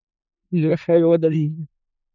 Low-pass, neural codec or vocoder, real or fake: 7.2 kHz; codec, 16 kHz in and 24 kHz out, 0.4 kbps, LongCat-Audio-Codec, four codebook decoder; fake